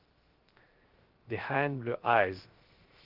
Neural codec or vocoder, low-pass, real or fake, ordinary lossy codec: codec, 16 kHz, 0.3 kbps, FocalCodec; 5.4 kHz; fake; Opus, 16 kbps